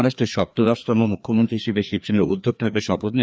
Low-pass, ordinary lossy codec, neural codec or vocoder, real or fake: none; none; codec, 16 kHz, 2 kbps, FreqCodec, larger model; fake